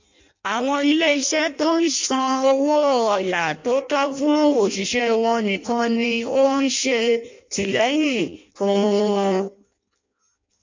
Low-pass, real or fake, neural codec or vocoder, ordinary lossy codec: 7.2 kHz; fake; codec, 16 kHz in and 24 kHz out, 0.6 kbps, FireRedTTS-2 codec; MP3, 48 kbps